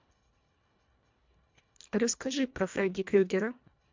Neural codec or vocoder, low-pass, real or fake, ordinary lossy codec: codec, 24 kHz, 1.5 kbps, HILCodec; 7.2 kHz; fake; MP3, 64 kbps